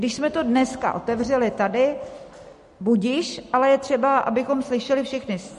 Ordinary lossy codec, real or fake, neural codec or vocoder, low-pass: MP3, 48 kbps; real; none; 14.4 kHz